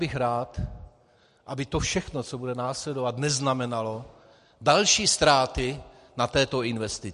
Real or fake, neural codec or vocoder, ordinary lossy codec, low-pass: real; none; MP3, 48 kbps; 14.4 kHz